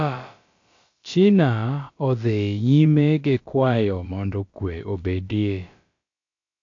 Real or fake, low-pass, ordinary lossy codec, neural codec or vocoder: fake; 7.2 kHz; AAC, 48 kbps; codec, 16 kHz, about 1 kbps, DyCAST, with the encoder's durations